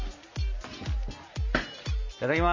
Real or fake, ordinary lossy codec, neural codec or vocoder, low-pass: real; MP3, 48 kbps; none; 7.2 kHz